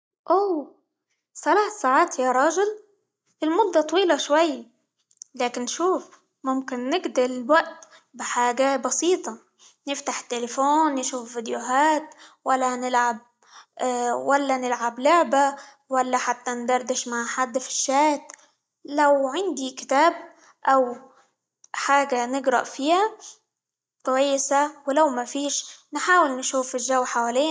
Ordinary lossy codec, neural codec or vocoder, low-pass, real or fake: none; none; none; real